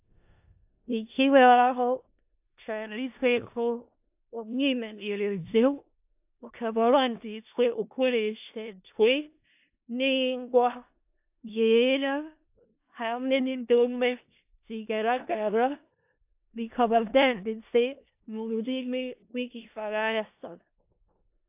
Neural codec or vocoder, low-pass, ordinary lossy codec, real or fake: codec, 16 kHz in and 24 kHz out, 0.4 kbps, LongCat-Audio-Codec, four codebook decoder; 3.6 kHz; AAC, 32 kbps; fake